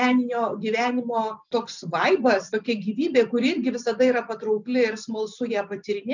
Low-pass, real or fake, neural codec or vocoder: 7.2 kHz; real; none